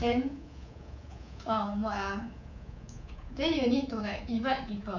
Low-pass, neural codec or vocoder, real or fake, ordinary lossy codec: 7.2 kHz; codec, 24 kHz, 3.1 kbps, DualCodec; fake; none